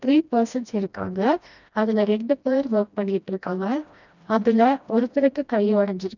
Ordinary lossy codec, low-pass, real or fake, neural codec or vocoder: none; 7.2 kHz; fake; codec, 16 kHz, 1 kbps, FreqCodec, smaller model